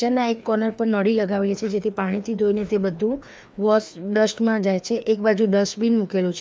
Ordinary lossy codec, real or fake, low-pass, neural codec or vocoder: none; fake; none; codec, 16 kHz, 2 kbps, FreqCodec, larger model